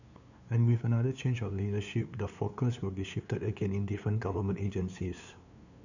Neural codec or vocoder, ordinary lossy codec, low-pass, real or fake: codec, 16 kHz, 2 kbps, FunCodec, trained on LibriTTS, 25 frames a second; none; 7.2 kHz; fake